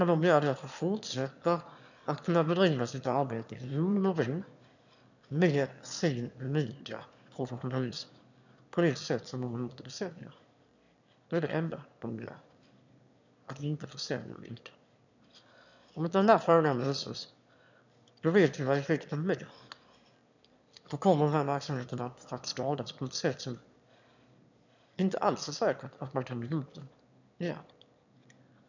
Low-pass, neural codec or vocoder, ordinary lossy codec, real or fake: 7.2 kHz; autoencoder, 22.05 kHz, a latent of 192 numbers a frame, VITS, trained on one speaker; none; fake